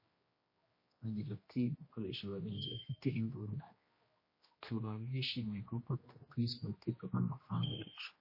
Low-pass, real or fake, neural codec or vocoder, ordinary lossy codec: 5.4 kHz; fake; codec, 16 kHz, 1 kbps, X-Codec, HuBERT features, trained on general audio; MP3, 24 kbps